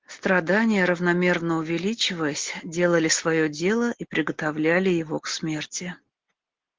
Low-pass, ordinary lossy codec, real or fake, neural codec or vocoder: 7.2 kHz; Opus, 16 kbps; real; none